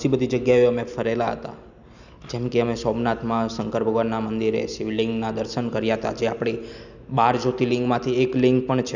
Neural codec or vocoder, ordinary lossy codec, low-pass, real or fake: none; none; 7.2 kHz; real